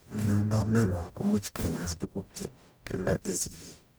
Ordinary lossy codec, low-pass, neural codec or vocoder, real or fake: none; none; codec, 44.1 kHz, 0.9 kbps, DAC; fake